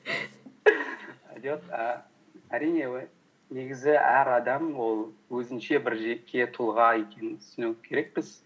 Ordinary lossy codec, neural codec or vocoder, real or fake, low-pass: none; none; real; none